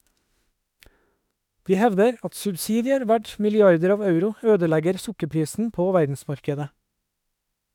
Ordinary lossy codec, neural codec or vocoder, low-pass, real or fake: none; autoencoder, 48 kHz, 32 numbers a frame, DAC-VAE, trained on Japanese speech; 19.8 kHz; fake